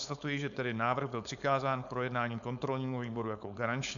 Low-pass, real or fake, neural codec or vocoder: 7.2 kHz; fake; codec, 16 kHz, 4.8 kbps, FACodec